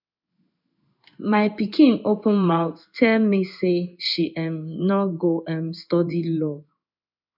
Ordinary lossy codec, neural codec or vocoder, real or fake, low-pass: none; codec, 16 kHz in and 24 kHz out, 1 kbps, XY-Tokenizer; fake; 5.4 kHz